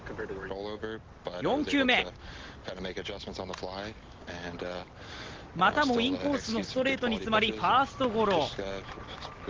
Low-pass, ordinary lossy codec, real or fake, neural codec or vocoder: 7.2 kHz; Opus, 16 kbps; real; none